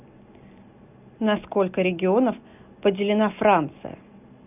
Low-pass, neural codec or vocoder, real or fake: 3.6 kHz; none; real